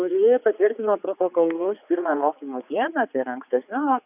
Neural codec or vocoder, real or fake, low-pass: codec, 16 kHz, 4 kbps, X-Codec, HuBERT features, trained on general audio; fake; 3.6 kHz